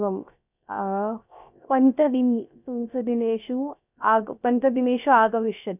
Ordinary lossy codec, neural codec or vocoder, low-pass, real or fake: none; codec, 16 kHz, 0.3 kbps, FocalCodec; 3.6 kHz; fake